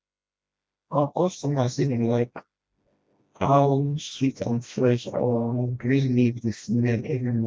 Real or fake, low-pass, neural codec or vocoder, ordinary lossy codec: fake; none; codec, 16 kHz, 1 kbps, FreqCodec, smaller model; none